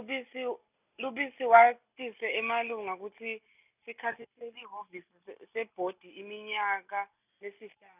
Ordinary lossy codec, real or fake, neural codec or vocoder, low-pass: none; real; none; 3.6 kHz